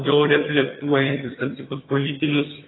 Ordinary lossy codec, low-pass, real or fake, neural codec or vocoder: AAC, 16 kbps; 7.2 kHz; fake; vocoder, 22.05 kHz, 80 mel bands, HiFi-GAN